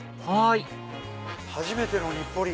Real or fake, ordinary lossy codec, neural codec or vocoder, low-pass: real; none; none; none